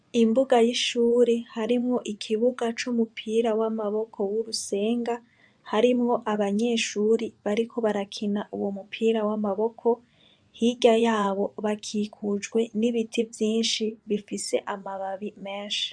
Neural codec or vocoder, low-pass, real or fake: vocoder, 24 kHz, 100 mel bands, Vocos; 9.9 kHz; fake